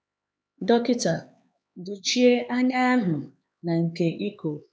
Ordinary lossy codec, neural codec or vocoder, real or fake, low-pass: none; codec, 16 kHz, 4 kbps, X-Codec, HuBERT features, trained on LibriSpeech; fake; none